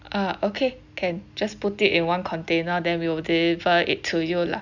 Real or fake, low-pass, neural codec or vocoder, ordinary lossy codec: real; 7.2 kHz; none; none